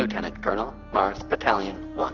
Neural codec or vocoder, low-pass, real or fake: none; 7.2 kHz; real